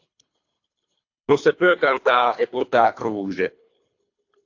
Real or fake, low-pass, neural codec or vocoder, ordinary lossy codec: fake; 7.2 kHz; codec, 24 kHz, 3 kbps, HILCodec; AAC, 48 kbps